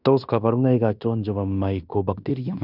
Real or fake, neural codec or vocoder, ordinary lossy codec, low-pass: fake; codec, 16 kHz in and 24 kHz out, 0.9 kbps, LongCat-Audio-Codec, fine tuned four codebook decoder; none; 5.4 kHz